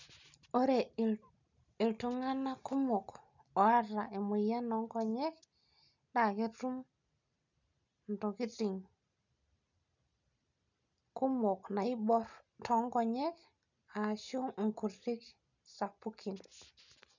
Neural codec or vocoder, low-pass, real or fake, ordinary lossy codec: none; 7.2 kHz; real; none